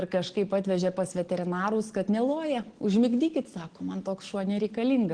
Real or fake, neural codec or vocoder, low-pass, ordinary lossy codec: real; none; 9.9 kHz; Opus, 16 kbps